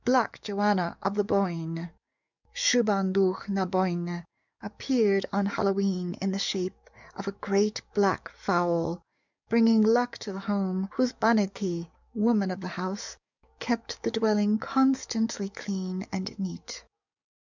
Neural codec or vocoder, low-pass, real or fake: codec, 44.1 kHz, 7.8 kbps, DAC; 7.2 kHz; fake